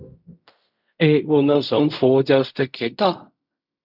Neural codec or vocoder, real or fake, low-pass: codec, 16 kHz in and 24 kHz out, 0.4 kbps, LongCat-Audio-Codec, fine tuned four codebook decoder; fake; 5.4 kHz